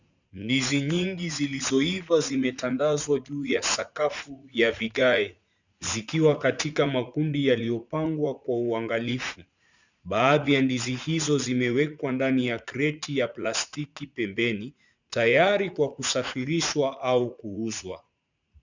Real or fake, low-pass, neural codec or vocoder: fake; 7.2 kHz; vocoder, 44.1 kHz, 80 mel bands, Vocos